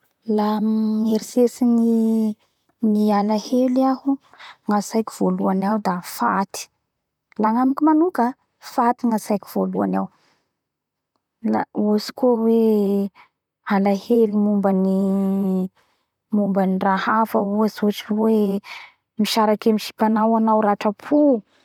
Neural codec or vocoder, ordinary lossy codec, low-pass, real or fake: vocoder, 44.1 kHz, 128 mel bands, Pupu-Vocoder; none; 19.8 kHz; fake